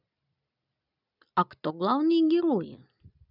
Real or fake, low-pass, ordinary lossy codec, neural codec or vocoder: real; 5.4 kHz; none; none